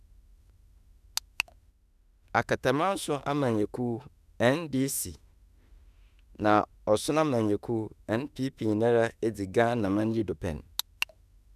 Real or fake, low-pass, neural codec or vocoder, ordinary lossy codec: fake; 14.4 kHz; autoencoder, 48 kHz, 32 numbers a frame, DAC-VAE, trained on Japanese speech; none